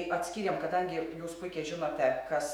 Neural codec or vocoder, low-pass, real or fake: autoencoder, 48 kHz, 128 numbers a frame, DAC-VAE, trained on Japanese speech; 19.8 kHz; fake